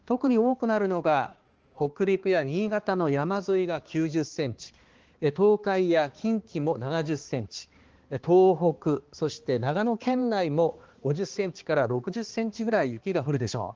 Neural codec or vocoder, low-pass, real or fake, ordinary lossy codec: codec, 16 kHz, 2 kbps, X-Codec, HuBERT features, trained on balanced general audio; 7.2 kHz; fake; Opus, 16 kbps